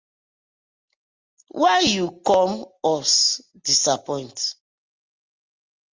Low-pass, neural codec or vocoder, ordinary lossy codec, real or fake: 7.2 kHz; none; Opus, 32 kbps; real